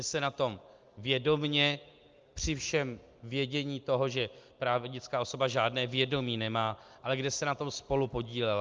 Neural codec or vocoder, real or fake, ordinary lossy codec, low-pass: none; real; Opus, 24 kbps; 7.2 kHz